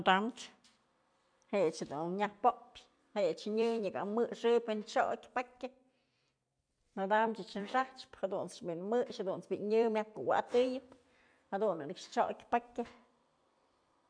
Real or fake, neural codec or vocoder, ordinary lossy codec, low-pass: fake; vocoder, 44.1 kHz, 128 mel bands every 256 samples, BigVGAN v2; none; 9.9 kHz